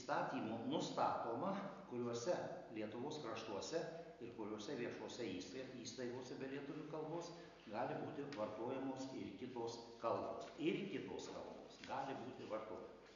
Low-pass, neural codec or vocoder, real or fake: 7.2 kHz; none; real